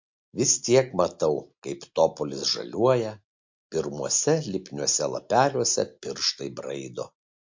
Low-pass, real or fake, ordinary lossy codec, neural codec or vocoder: 7.2 kHz; real; MP3, 48 kbps; none